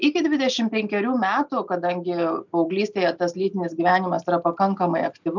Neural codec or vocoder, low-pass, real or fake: none; 7.2 kHz; real